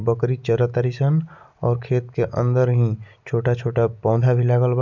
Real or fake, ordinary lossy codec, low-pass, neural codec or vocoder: real; none; 7.2 kHz; none